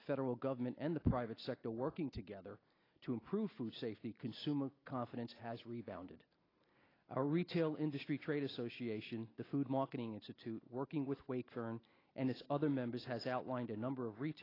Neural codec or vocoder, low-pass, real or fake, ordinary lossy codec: none; 5.4 kHz; real; AAC, 24 kbps